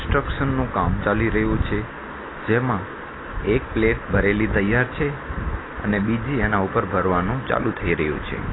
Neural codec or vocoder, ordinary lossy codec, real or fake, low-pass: none; AAC, 16 kbps; real; 7.2 kHz